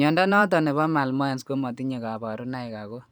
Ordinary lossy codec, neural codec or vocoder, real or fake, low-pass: none; vocoder, 44.1 kHz, 128 mel bands every 512 samples, BigVGAN v2; fake; none